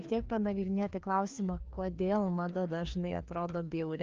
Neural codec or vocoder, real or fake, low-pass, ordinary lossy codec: codec, 16 kHz, 2 kbps, FreqCodec, larger model; fake; 7.2 kHz; Opus, 24 kbps